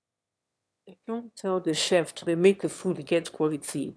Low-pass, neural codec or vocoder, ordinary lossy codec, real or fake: none; autoencoder, 22.05 kHz, a latent of 192 numbers a frame, VITS, trained on one speaker; none; fake